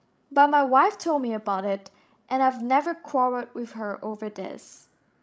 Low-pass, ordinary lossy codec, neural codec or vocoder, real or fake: none; none; codec, 16 kHz, 16 kbps, FreqCodec, larger model; fake